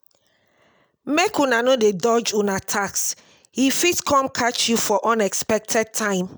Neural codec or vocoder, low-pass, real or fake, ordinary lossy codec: none; none; real; none